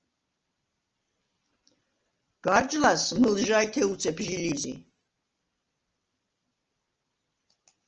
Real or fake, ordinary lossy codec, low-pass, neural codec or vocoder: real; Opus, 24 kbps; 7.2 kHz; none